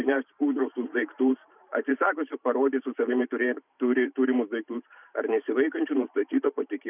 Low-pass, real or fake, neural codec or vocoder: 3.6 kHz; fake; vocoder, 44.1 kHz, 128 mel bands every 512 samples, BigVGAN v2